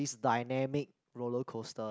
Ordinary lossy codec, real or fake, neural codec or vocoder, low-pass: none; real; none; none